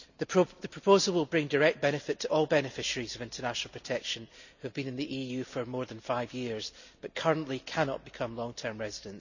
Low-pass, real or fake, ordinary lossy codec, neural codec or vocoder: 7.2 kHz; real; none; none